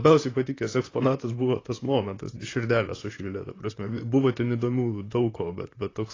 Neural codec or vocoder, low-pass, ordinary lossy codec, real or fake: vocoder, 44.1 kHz, 128 mel bands, Pupu-Vocoder; 7.2 kHz; AAC, 32 kbps; fake